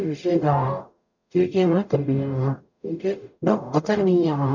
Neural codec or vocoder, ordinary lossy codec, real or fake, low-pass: codec, 44.1 kHz, 0.9 kbps, DAC; none; fake; 7.2 kHz